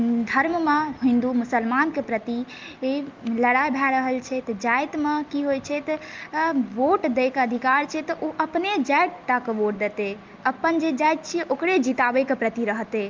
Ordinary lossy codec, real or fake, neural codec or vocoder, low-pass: Opus, 32 kbps; real; none; 7.2 kHz